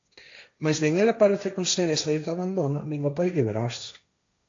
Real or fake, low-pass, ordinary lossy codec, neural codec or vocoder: fake; 7.2 kHz; AAC, 48 kbps; codec, 16 kHz, 1.1 kbps, Voila-Tokenizer